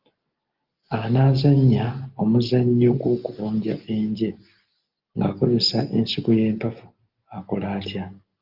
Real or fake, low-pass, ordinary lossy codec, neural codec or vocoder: real; 5.4 kHz; Opus, 32 kbps; none